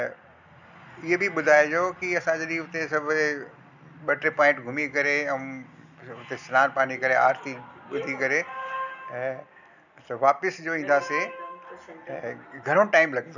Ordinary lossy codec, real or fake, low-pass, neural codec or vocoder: none; real; 7.2 kHz; none